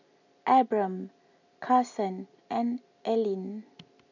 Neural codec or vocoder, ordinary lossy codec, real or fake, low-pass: none; none; real; 7.2 kHz